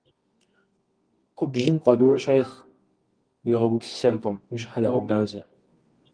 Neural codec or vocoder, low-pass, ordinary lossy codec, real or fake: codec, 24 kHz, 0.9 kbps, WavTokenizer, medium music audio release; 9.9 kHz; Opus, 24 kbps; fake